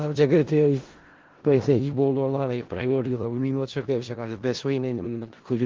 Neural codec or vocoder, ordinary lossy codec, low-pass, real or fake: codec, 16 kHz in and 24 kHz out, 0.4 kbps, LongCat-Audio-Codec, four codebook decoder; Opus, 16 kbps; 7.2 kHz; fake